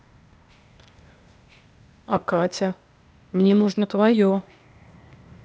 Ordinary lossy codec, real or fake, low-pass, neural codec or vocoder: none; fake; none; codec, 16 kHz, 0.8 kbps, ZipCodec